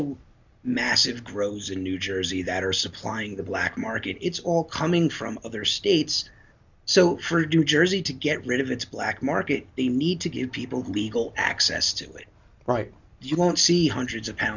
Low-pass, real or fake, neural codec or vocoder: 7.2 kHz; real; none